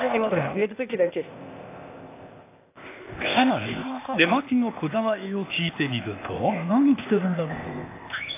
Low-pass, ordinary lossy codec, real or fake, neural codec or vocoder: 3.6 kHz; AAC, 24 kbps; fake; codec, 16 kHz, 0.8 kbps, ZipCodec